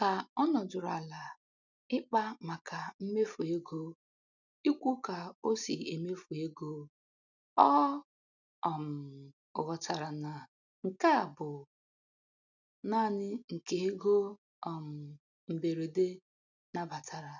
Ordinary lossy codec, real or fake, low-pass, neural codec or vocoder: none; real; 7.2 kHz; none